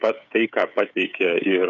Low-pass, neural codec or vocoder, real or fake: 7.2 kHz; codec, 16 kHz, 16 kbps, FreqCodec, smaller model; fake